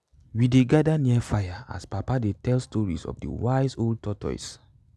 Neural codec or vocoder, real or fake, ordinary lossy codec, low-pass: none; real; none; none